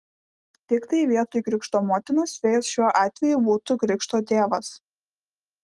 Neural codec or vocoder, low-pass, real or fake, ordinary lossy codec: none; 10.8 kHz; real; Opus, 24 kbps